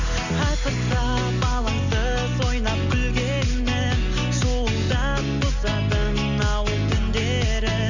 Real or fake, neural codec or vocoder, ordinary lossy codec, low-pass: real; none; none; 7.2 kHz